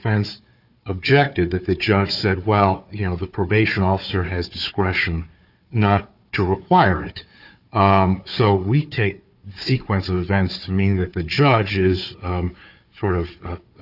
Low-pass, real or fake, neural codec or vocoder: 5.4 kHz; fake; codec, 16 kHz, 4 kbps, FunCodec, trained on Chinese and English, 50 frames a second